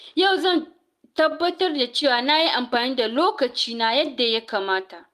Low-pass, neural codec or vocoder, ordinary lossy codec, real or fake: 14.4 kHz; none; Opus, 32 kbps; real